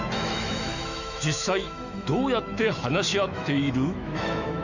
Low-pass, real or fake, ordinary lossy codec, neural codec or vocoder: 7.2 kHz; fake; none; autoencoder, 48 kHz, 128 numbers a frame, DAC-VAE, trained on Japanese speech